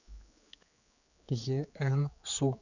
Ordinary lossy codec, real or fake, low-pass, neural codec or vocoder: none; fake; 7.2 kHz; codec, 16 kHz, 4 kbps, X-Codec, HuBERT features, trained on general audio